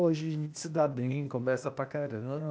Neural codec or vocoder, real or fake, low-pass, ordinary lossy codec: codec, 16 kHz, 0.8 kbps, ZipCodec; fake; none; none